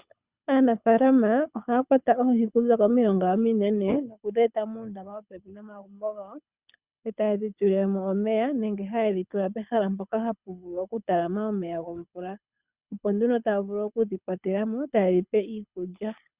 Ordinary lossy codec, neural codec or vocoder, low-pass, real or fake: Opus, 64 kbps; codec, 24 kHz, 6 kbps, HILCodec; 3.6 kHz; fake